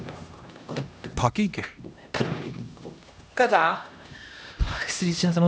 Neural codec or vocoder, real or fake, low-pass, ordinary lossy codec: codec, 16 kHz, 1 kbps, X-Codec, HuBERT features, trained on LibriSpeech; fake; none; none